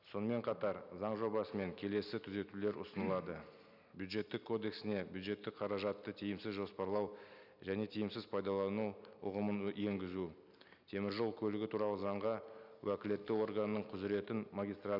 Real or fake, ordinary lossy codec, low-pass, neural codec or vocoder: real; none; 5.4 kHz; none